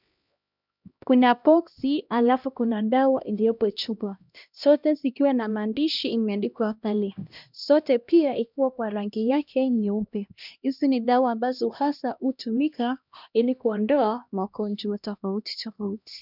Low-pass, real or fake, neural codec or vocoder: 5.4 kHz; fake; codec, 16 kHz, 1 kbps, X-Codec, HuBERT features, trained on LibriSpeech